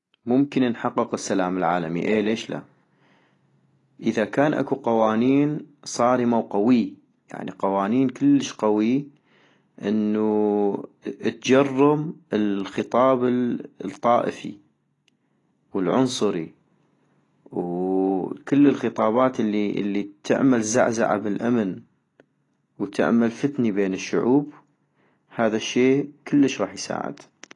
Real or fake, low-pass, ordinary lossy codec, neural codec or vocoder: real; 10.8 kHz; AAC, 32 kbps; none